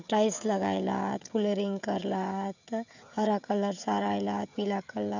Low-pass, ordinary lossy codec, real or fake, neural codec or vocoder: 7.2 kHz; none; fake; codec, 16 kHz, 16 kbps, FreqCodec, smaller model